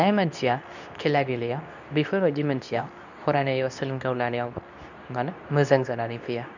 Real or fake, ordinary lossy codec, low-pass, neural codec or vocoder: fake; none; 7.2 kHz; codec, 24 kHz, 0.9 kbps, WavTokenizer, medium speech release version 2